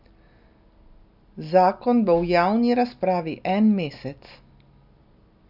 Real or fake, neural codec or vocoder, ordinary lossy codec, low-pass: real; none; none; 5.4 kHz